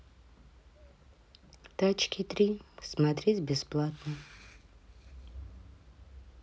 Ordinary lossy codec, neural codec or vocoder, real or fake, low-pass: none; none; real; none